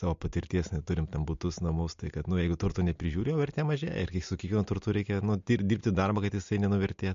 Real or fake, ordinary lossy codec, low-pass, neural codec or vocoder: real; MP3, 48 kbps; 7.2 kHz; none